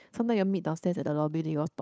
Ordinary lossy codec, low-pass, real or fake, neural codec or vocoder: none; none; fake; codec, 16 kHz, 8 kbps, FunCodec, trained on Chinese and English, 25 frames a second